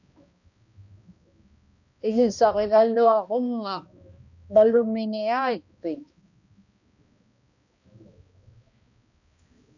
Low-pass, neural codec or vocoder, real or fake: 7.2 kHz; codec, 16 kHz, 1 kbps, X-Codec, HuBERT features, trained on balanced general audio; fake